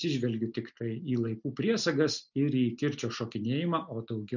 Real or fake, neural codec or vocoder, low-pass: real; none; 7.2 kHz